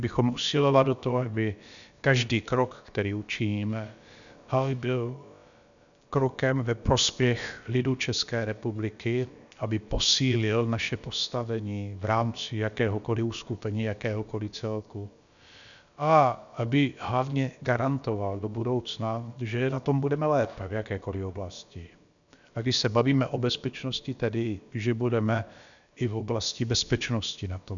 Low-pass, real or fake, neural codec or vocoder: 7.2 kHz; fake; codec, 16 kHz, about 1 kbps, DyCAST, with the encoder's durations